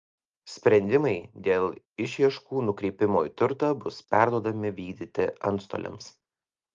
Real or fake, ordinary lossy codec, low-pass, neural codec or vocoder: real; Opus, 32 kbps; 7.2 kHz; none